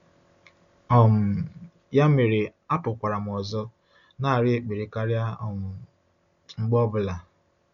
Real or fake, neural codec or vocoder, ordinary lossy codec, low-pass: real; none; none; 7.2 kHz